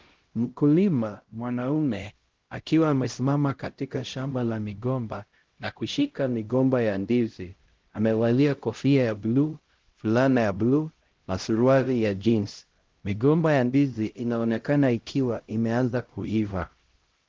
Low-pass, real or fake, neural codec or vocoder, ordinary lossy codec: 7.2 kHz; fake; codec, 16 kHz, 0.5 kbps, X-Codec, HuBERT features, trained on LibriSpeech; Opus, 16 kbps